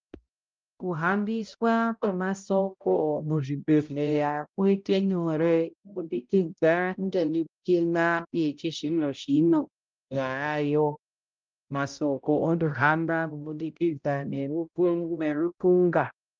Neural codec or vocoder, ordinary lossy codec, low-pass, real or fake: codec, 16 kHz, 0.5 kbps, X-Codec, HuBERT features, trained on balanced general audio; Opus, 24 kbps; 7.2 kHz; fake